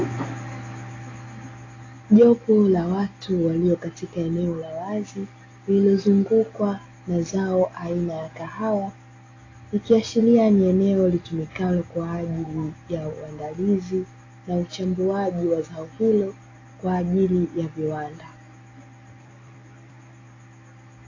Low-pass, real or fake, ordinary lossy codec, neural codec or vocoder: 7.2 kHz; real; AAC, 32 kbps; none